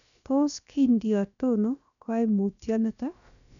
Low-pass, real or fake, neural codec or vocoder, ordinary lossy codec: 7.2 kHz; fake; codec, 16 kHz, about 1 kbps, DyCAST, with the encoder's durations; none